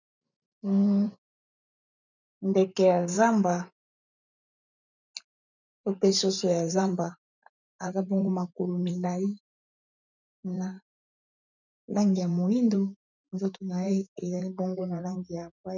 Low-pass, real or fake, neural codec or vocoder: 7.2 kHz; fake; vocoder, 44.1 kHz, 128 mel bands every 512 samples, BigVGAN v2